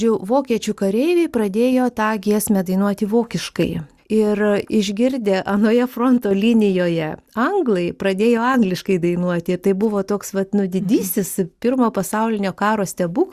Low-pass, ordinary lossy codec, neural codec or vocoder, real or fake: 14.4 kHz; Opus, 64 kbps; none; real